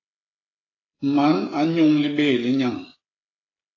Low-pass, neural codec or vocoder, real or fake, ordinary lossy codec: 7.2 kHz; codec, 16 kHz, 8 kbps, FreqCodec, smaller model; fake; AAC, 32 kbps